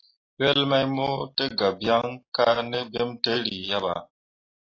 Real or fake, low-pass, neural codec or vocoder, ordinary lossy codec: real; 7.2 kHz; none; AAC, 32 kbps